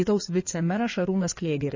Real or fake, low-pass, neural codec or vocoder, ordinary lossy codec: fake; 7.2 kHz; codec, 16 kHz in and 24 kHz out, 2.2 kbps, FireRedTTS-2 codec; MP3, 32 kbps